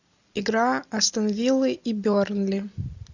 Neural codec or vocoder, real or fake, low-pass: none; real; 7.2 kHz